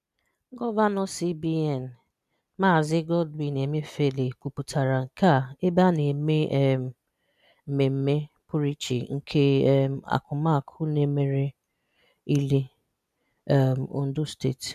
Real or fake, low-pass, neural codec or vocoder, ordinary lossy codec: real; 14.4 kHz; none; none